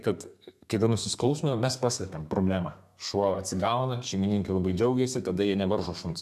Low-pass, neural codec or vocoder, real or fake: 14.4 kHz; codec, 32 kHz, 1.9 kbps, SNAC; fake